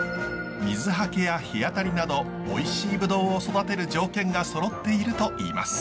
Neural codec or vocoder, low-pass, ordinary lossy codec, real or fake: none; none; none; real